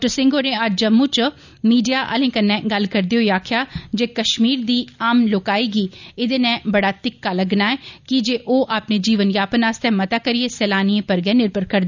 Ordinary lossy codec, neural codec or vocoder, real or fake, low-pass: none; none; real; 7.2 kHz